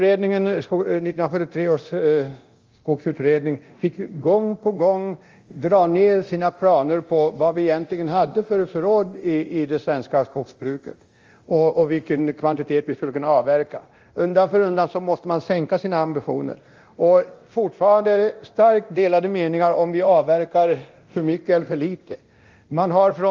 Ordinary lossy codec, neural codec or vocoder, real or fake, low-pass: Opus, 32 kbps; codec, 24 kHz, 0.9 kbps, DualCodec; fake; 7.2 kHz